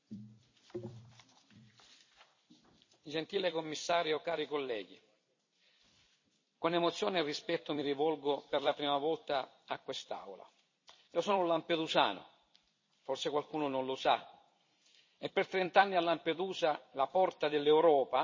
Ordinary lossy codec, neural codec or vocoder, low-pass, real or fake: MP3, 32 kbps; vocoder, 44.1 kHz, 128 mel bands every 256 samples, BigVGAN v2; 7.2 kHz; fake